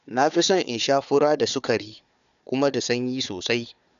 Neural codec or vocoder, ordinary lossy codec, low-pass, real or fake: codec, 16 kHz, 4 kbps, FunCodec, trained on Chinese and English, 50 frames a second; none; 7.2 kHz; fake